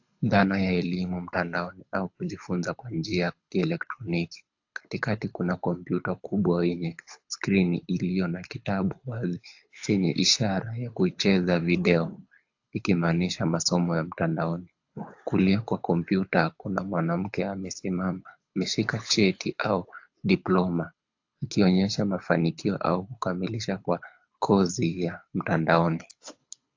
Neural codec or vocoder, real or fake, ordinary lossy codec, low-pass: codec, 24 kHz, 6 kbps, HILCodec; fake; AAC, 48 kbps; 7.2 kHz